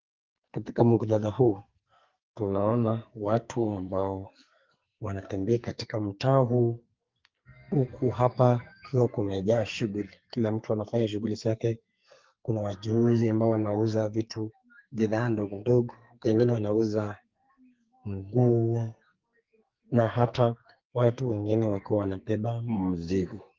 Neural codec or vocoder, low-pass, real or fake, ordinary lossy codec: codec, 44.1 kHz, 2.6 kbps, SNAC; 7.2 kHz; fake; Opus, 32 kbps